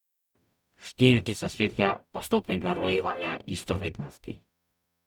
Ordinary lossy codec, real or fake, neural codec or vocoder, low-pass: none; fake; codec, 44.1 kHz, 0.9 kbps, DAC; 19.8 kHz